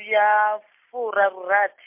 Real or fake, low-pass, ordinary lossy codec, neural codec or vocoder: real; 3.6 kHz; MP3, 32 kbps; none